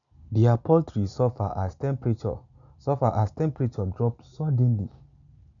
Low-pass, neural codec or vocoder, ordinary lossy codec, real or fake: 7.2 kHz; none; none; real